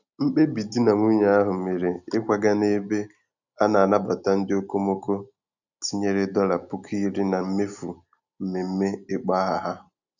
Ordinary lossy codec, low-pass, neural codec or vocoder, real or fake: none; 7.2 kHz; none; real